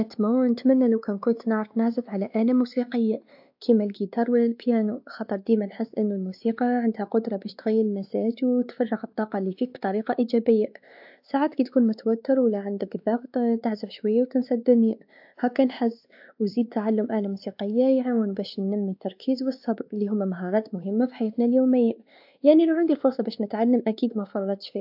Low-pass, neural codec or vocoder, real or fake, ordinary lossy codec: 5.4 kHz; codec, 16 kHz, 2 kbps, X-Codec, WavLM features, trained on Multilingual LibriSpeech; fake; AAC, 48 kbps